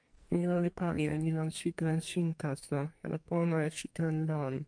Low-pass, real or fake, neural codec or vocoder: 9.9 kHz; fake; codec, 44.1 kHz, 2.6 kbps, SNAC